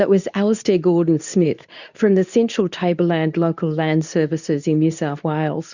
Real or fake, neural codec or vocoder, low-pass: fake; codec, 24 kHz, 0.9 kbps, WavTokenizer, medium speech release version 2; 7.2 kHz